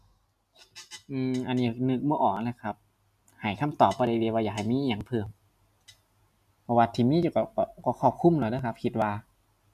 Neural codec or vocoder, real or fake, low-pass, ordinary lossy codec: none; real; 14.4 kHz; none